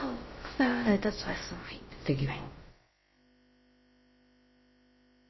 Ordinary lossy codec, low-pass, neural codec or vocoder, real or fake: MP3, 24 kbps; 7.2 kHz; codec, 16 kHz, about 1 kbps, DyCAST, with the encoder's durations; fake